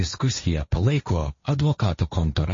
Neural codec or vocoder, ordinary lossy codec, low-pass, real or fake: codec, 16 kHz, 1.1 kbps, Voila-Tokenizer; MP3, 32 kbps; 7.2 kHz; fake